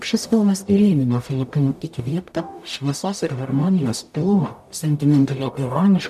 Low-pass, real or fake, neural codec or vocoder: 14.4 kHz; fake; codec, 44.1 kHz, 0.9 kbps, DAC